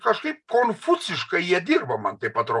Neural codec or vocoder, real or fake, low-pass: none; real; 10.8 kHz